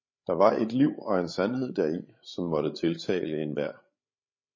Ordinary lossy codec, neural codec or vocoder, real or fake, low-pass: MP3, 32 kbps; codec, 16 kHz, 16 kbps, FreqCodec, larger model; fake; 7.2 kHz